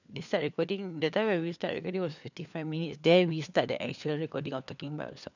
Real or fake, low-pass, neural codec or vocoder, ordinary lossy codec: fake; 7.2 kHz; codec, 16 kHz, 4 kbps, FunCodec, trained on LibriTTS, 50 frames a second; none